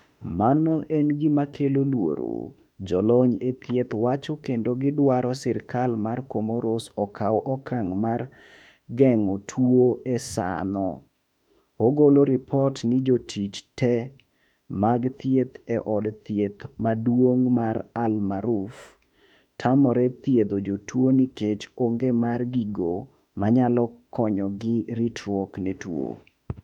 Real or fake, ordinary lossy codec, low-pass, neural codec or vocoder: fake; none; 19.8 kHz; autoencoder, 48 kHz, 32 numbers a frame, DAC-VAE, trained on Japanese speech